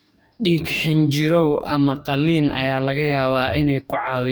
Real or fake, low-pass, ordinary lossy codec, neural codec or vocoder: fake; none; none; codec, 44.1 kHz, 2.6 kbps, DAC